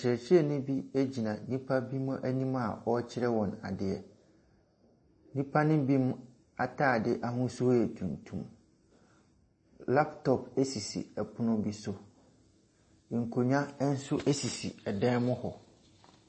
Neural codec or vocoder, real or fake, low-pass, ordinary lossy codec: none; real; 9.9 kHz; MP3, 32 kbps